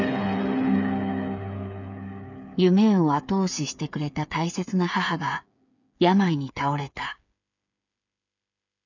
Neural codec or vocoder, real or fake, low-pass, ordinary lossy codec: codec, 16 kHz, 8 kbps, FreqCodec, smaller model; fake; 7.2 kHz; none